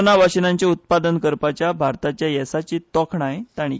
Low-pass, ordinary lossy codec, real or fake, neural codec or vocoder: none; none; real; none